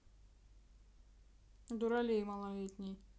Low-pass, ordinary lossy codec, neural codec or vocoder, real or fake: none; none; none; real